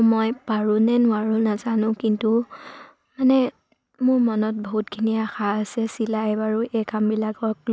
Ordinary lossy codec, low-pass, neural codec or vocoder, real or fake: none; none; none; real